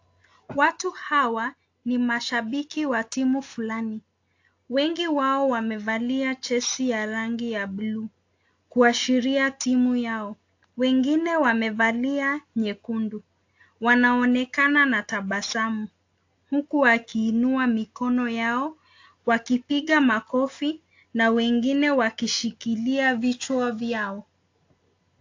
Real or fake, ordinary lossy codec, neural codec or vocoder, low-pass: real; AAC, 48 kbps; none; 7.2 kHz